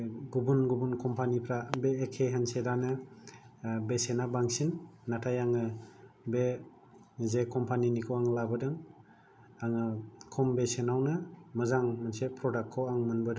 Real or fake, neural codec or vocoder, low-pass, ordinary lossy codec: real; none; none; none